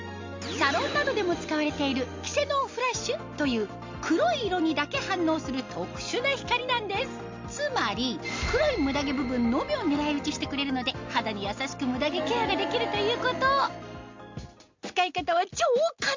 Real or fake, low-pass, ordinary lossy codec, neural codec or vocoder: real; 7.2 kHz; MP3, 64 kbps; none